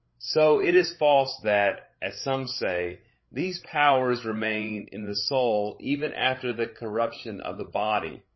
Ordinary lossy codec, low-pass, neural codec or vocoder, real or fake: MP3, 24 kbps; 7.2 kHz; codec, 16 kHz, 16 kbps, FreqCodec, larger model; fake